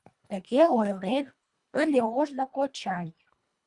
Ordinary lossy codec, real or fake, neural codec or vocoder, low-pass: Opus, 64 kbps; fake; codec, 24 kHz, 1.5 kbps, HILCodec; 10.8 kHz